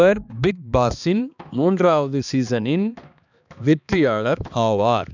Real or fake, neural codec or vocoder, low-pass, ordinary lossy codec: fake; codec, 16 kHz, 2 kbps, X-Codec, HuBERT features, trained on balanced general audio; 7.2 kHz; none